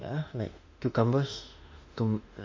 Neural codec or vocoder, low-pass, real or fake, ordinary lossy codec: autoencoder, 48 kHz, 32 numbers a frame, DAC-VAE, trained on Japanese speech; 7.2 kHz; fake; MP3, 48 kbps